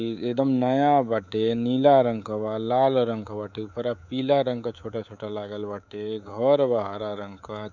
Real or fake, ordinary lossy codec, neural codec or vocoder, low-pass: fake; none; codec, 24 kHz, 3.1 kbps, DualCodec; 7.2 kHz